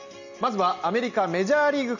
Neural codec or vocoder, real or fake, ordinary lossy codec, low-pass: none; real; none; 7.2 kHz